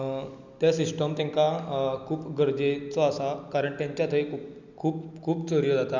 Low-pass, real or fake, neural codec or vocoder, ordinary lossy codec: 7.2 kHz; real; none; none